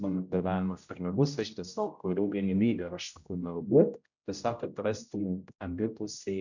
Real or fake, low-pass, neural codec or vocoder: fake; 7.2 kHz; codec, 16 kHz, 0.5 kbps, X-Codec, HuBERT features, trained on general audio